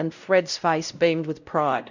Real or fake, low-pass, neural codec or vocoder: fake; 7.2 kHz; codec, 16 kHz, 0.5 kbps, X-Codec, WavLM features, trained on Multilingual LibriSpeech